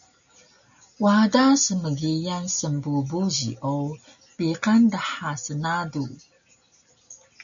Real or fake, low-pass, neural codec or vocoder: real; 7.2 kHz; none